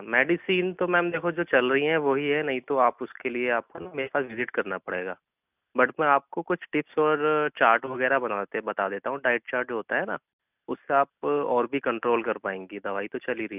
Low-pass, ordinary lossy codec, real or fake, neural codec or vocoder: 3.6 kHz; none; real; none